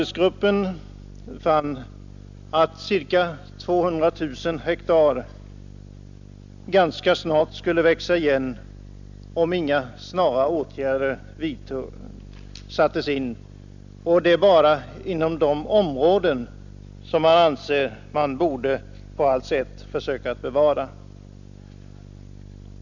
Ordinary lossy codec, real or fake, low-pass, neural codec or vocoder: none; real; 7.2 kHz; none